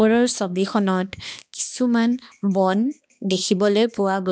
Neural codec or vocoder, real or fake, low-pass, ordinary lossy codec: codec, 16 kHz, 2 kbps, X-Codec, HuBERT features, trained on balanced general audio; fake; none; none